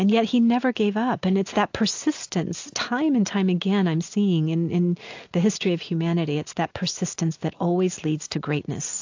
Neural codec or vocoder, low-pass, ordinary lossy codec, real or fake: none; 7.2 kHz; AAC, 48 kbps; real